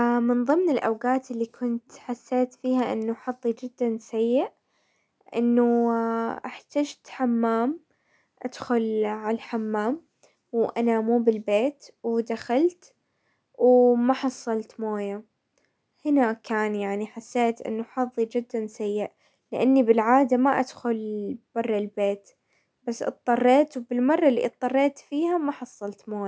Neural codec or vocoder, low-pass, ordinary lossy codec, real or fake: none; none; none; real